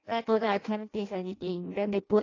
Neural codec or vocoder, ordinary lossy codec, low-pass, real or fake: codec, 16 kHz in and 24 kHz out, 0.6 kbps, FireRedTTS-2 codec; AAC, 32 kbps; 7.2 kHz; fake